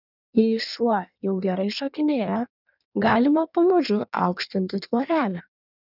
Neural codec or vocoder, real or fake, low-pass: codec, 16 kHz in and 24 kHz out, 1.1 kbps, FireRedTTS-2 codec; fake; 5.4 kHz